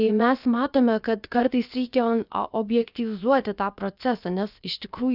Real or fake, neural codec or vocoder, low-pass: fake; codec, 16 kHz, about 1 kbps, DyCAST, with the encoder's durations; 5.4 kHz